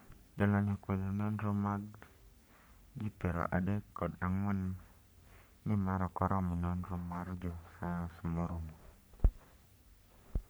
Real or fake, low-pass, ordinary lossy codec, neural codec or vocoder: fake; none; none; codec, 44.1 kHz, 3.4 kbps, Pupu-Codec